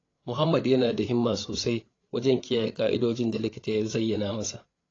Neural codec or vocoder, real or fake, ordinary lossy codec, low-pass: codec, 16 kHz, 8 kbps, FreqCodec, larger model; fake; AAC, 32 kbps; 7.2 kHz